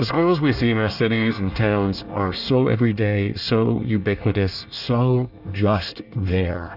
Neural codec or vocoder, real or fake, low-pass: codec, 24 kHz, 1 kbps, SNAC; fake; 5.4 kHz